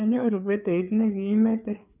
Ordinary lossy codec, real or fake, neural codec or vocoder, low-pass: none; fake; codec, 16 kHz, 4 kbps, FreqCodec, larger model; 3.6 kHz